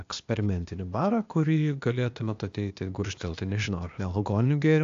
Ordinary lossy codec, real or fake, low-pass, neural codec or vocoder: AAC, 64 kbps; fake; 7.2 kHz; codec, 16 kHz, 0.8 kbps, ZipCodec